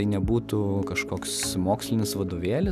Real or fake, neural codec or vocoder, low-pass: real; none; 14.4 kHz